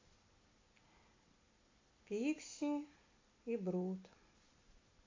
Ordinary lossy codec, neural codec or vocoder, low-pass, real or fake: MP3, 32 kbps; none; 7.2 kHz; real